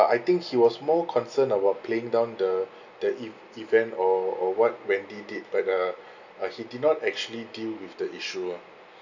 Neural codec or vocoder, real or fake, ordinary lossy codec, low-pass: none; real; none; 7.2 kHz